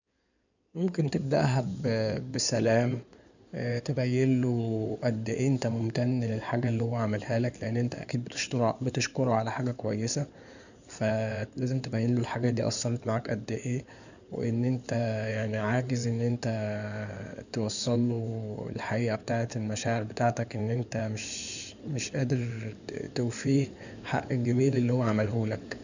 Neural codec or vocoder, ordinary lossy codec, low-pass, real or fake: codec, 16 kHz in and 24 kHz out, 2.2 kbps, FireRedTTS-2 codec; none; 7.2 kHz; fake